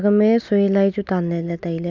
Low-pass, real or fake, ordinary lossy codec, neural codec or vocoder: 7.2 kHz; real; none; none